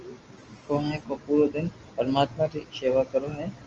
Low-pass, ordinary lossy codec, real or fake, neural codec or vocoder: 7.2 kHz; Opus, 24 kbps; real; none